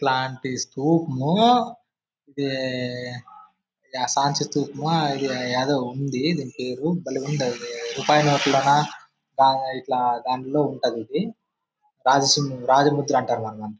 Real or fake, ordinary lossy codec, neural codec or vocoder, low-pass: real; none; none; none